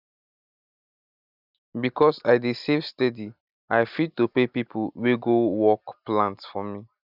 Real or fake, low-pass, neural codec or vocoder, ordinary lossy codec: real; 5.4 kHz; none; none